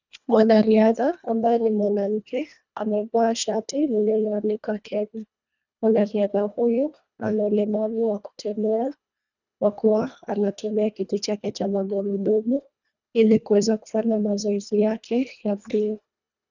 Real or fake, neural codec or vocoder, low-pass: fake; codec, 24 kHz, 1.5 kbps, HILCodec; 7.2 kHz